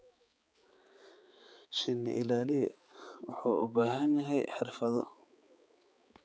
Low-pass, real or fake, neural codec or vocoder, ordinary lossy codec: none; fake; codec, 16 kHz, 4 kbps, X-Codec, HuBERT features, trained on balanced general audio; none